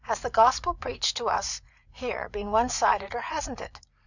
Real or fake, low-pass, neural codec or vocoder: fake; 7.2 kHz; vocoder, 44.1 kHz, 80 mel bands, Vocos